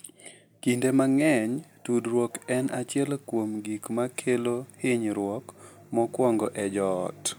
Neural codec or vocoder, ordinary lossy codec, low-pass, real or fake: none; none; none; real